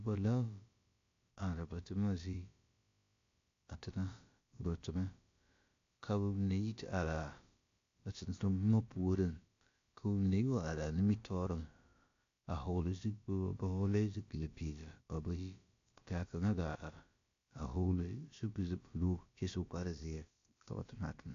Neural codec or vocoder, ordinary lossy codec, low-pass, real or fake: codec, 16 kHz, about 1 kbps, DyCAST, with the encoder's durations; AAC, 48 kbps; 7.2 kHz; fake